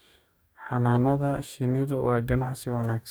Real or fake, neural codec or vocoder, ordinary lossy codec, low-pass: fake; codec, 44.1 kHz, 2.6 kbps, DAC; none; none